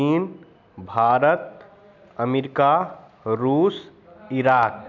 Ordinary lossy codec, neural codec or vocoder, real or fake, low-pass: none; none; real; 7.2 kHz